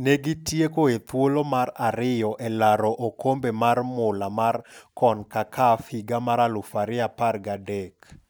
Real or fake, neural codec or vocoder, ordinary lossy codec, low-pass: real; none; none; none